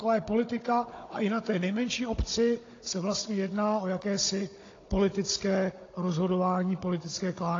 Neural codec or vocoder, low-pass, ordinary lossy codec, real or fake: codec, 16 kHz, 16 kbps, FunCodec, trained on Chinese and English, 50 frames a second; 7.2 kHz; AAC, 32 kbps; fake